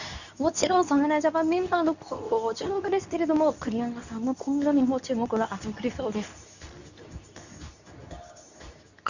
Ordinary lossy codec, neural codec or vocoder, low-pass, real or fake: none; codec, 24 kHz, 0.9 kbps, WavTokenizer, medium speech release version 2; 7.2 kHz; fake